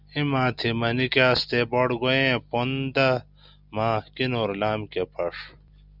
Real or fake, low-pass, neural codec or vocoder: real; 5.4 kHz; none